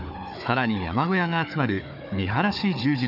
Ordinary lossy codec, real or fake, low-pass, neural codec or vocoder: none; fake; 5.4 kHz; codec, 16 kHz, 4 kbps, FunCodec, trained on Chinese and English, 50 frames a second